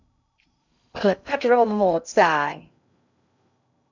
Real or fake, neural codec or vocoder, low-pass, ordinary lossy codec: fake; codec, 16 kHz in and 24 kHz out, 0.6 kbps, FocalCodec, streaming, 2048 codes; 7.2 kHz; none